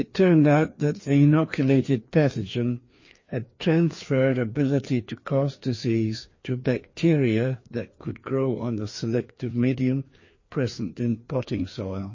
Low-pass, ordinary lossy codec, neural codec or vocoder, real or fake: 7.2 kHz; MP3, 32 kbps; codec, 16 kHz, 2 kbps, FreqCodec, larger model; fake